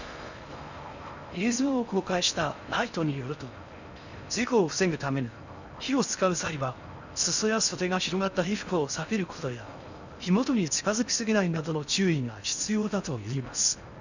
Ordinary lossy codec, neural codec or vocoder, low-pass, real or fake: none; codec, 16 kHz in and 24 kHz out, 0.6 kbps, FocalCodec, streaming, 4096 codes; 7.2 kHz; fake